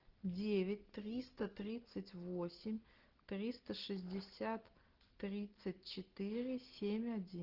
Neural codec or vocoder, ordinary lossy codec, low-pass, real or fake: none; Opus, 16 kbps; 5.4 kHz; real